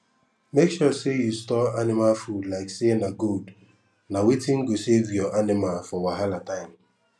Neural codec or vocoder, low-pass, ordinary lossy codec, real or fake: none; none; none; real